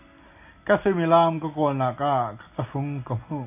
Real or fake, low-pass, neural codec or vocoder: real; 3.6 kHz; none